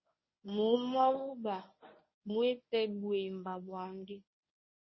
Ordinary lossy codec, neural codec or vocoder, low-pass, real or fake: MP3, 24 kbps; codec, 24 kHz, 0.9 kbps, WavTokenizer, medium speech release version 1; 7.2 kHz; fake